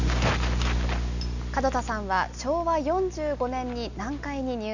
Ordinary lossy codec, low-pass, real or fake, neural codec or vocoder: none; 7.2 kHz; real; none